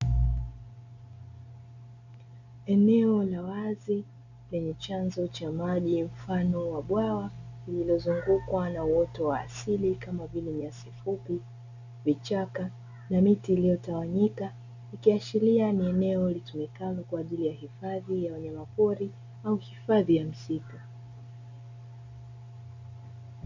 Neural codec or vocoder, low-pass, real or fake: none; 7.2 kHz; real